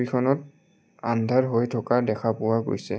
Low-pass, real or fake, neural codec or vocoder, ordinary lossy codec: none; real; none; none